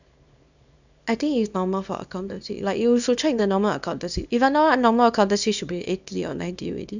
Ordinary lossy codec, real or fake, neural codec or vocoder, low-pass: none; fake; codec, 24 kHz, 0.9 kbps, WavTokenizer, small release; 7.2 kHz